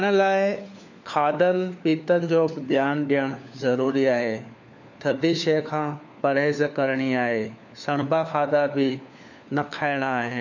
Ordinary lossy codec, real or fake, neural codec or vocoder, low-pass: none; fake; codec, 16 kHz, 4 kbps, FunCodec, trained on LibriTTS, 50 frames a second; 7.2 kHz